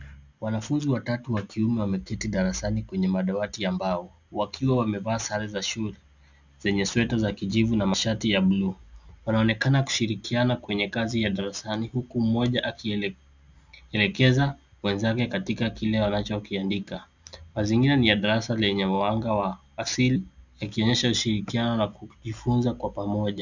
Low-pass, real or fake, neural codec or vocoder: 7.2 kHz; real; none